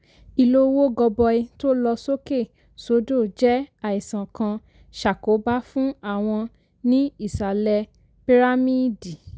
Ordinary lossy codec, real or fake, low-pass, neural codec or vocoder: none; real; none; none